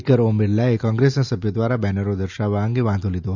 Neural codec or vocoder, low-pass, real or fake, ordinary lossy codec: none; 7.2 kHz; real; none